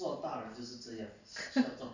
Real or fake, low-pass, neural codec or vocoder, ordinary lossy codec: real; 7.2 kHz; none; none